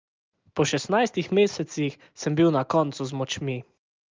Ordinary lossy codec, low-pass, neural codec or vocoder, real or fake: Opus, 32 kbps; 7.2 kHz; none; real